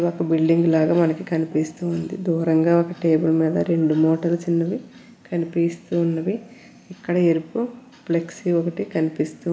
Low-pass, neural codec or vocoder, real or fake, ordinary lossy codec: none; none; real; none